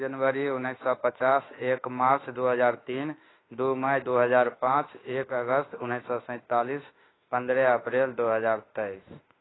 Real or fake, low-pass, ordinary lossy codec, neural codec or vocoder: fake; 7.2 kHz; AAC, 16 kbps; autoencoder, 48 kHz, 32 numbers a frame, DAC-VAE, trained on Japanese speech